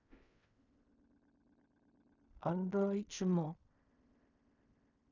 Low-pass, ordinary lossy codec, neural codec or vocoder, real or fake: 7.2 kHz; none; codec, 16 kHz in and 24 kHz out, 0.4 kbps, LongCat-Audio-Codec, fine tuned four codebook decoder; fake